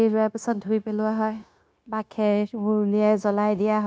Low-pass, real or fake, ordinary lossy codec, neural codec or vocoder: none; fake; none; codec, 16 kHz, 0.9 kbps, LongCat-Audio-Codec